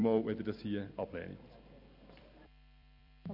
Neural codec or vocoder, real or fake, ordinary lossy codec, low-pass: vocoder, 44.1 kHz, 128 mel bands every 256 samples, BigVGAN v2; fake; none; 5.4 kHz